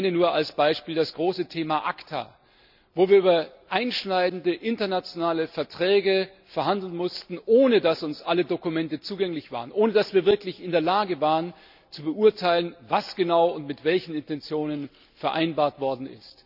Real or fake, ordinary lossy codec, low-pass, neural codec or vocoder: real; none; 5.4 kHz; none